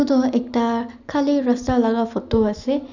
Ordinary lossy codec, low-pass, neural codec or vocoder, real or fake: none; 7.2 kHz; none; real